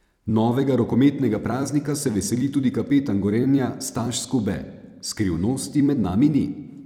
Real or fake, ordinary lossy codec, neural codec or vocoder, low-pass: fake; none; vocoder, 44.1 kHz, 128 mel bands every 256 samples, BigVGAN v2; 19.8 kHz